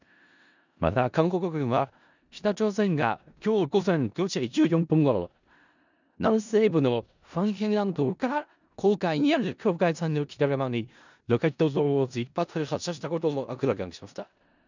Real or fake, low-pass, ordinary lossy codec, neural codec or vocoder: fake; 7.2 kHz; none; codec, 16 kHz in and 24 kHz out, 0.4 kbps, LongCat-Audio-Codec, four codebook decoder